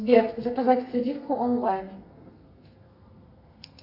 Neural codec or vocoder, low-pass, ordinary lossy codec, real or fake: codec, 32 kHz, 1.9 kbps, SNAC; 5.4 kHz; AAC, 48 kbps; fake